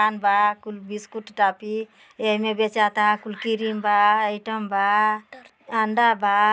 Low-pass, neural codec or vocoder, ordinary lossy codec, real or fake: none; none; none; real